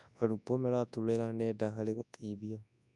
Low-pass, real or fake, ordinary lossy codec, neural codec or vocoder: 10.8 kHz; fake; none; codec, 24 kHz, 0.9 kbps, WavTokenizer, large speech release